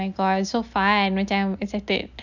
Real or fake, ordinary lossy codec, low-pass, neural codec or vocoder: real; none; 7.2 kHz; none